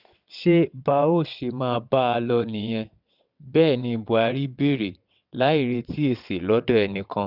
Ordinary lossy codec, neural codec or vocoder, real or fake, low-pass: none; vocoder, 22.05 kHz, 80 mel bands, WaveNeXt; fake; 5.4 kHz